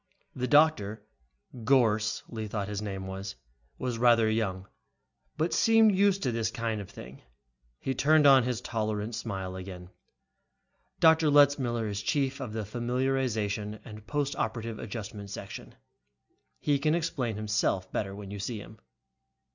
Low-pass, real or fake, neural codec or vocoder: 7.2 kHz; real; none